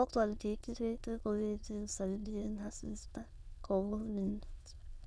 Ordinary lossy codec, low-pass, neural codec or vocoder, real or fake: none; none; autoencoder, 22.05 kHz, a latent of 192 numbers a frame, VITS, trained on many speakers; fake